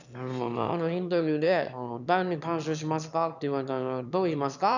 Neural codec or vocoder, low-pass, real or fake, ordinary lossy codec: autoencoder, 22.05 kHz, a latent of 192 numbers a frame, VITS, trained on one speaker; 7.2 kHz; fake; none